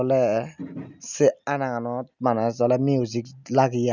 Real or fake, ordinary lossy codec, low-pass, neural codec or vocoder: real; none; 7.2 kHz; none